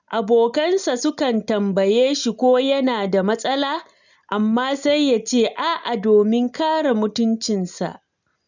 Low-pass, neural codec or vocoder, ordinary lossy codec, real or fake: 7.2 kHz; none; none; real